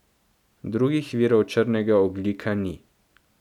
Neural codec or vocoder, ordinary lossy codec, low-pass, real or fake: none; none; 19.8 kHz; real